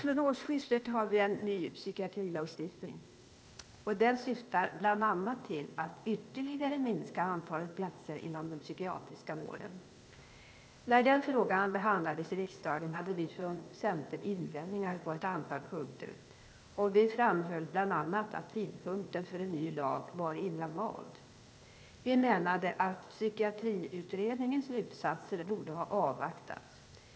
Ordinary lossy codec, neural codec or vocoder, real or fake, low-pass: none; codec, 16 kHz, 0.8 kbps, ZipCodec; fake; none